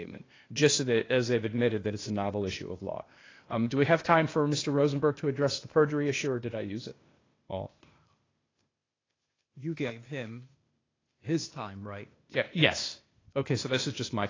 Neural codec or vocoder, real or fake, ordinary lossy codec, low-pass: codec, 16 kHz, 0.8 kbps, ZipCodec; fake; AAC, 32 kbps; 7.2 kHz